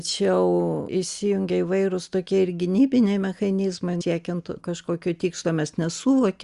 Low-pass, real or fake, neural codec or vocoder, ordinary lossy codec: 10.8 kHz; real; none; Opus, 64 kbps